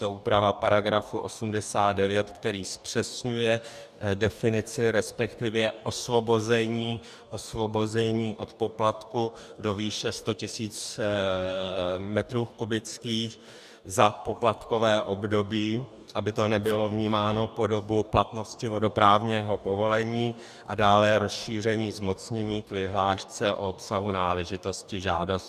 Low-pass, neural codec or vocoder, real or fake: 14.4 kHz; codec, 44.1 kHz, 2.6 kbps, DAC; fake